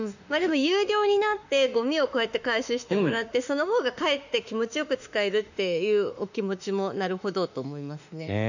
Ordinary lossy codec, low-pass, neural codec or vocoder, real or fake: none; 7.2 kHz; autoencoder, 48 kHz, 32 numbers a frame, DAC-VAE, trained on Japanese speech; fake